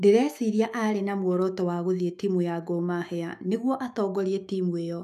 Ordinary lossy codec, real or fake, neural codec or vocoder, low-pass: none; fake; autoencoder, 48 kHz, 128 numbers a frame, DAC-VAE, trained on Japanese speech; 14.4 kHz